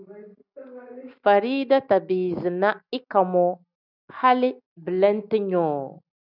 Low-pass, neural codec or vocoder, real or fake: 5.4 kHz; none; real